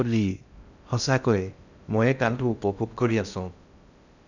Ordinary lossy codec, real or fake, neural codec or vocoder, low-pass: none; fake; codec, 16 kHz in and 24 kHz out, 0.6 kbps, FocalCodec, streaming, 2048 codes; 7.2 kHz